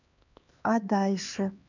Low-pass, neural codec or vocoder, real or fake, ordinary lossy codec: 7.2 kHz; codec, 16 kHz, 2 kbps, X-Codec, HuBERT features, trained on LibriSpeech; fake; none